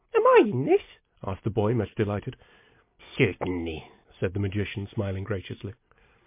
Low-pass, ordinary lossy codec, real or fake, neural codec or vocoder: 3.6 kHz; MP3, 24 kbps; real; none